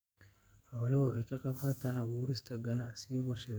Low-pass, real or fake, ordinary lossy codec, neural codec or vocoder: none; fake; none; codec, 44.1 kHz, 2.6 kbps, SNAC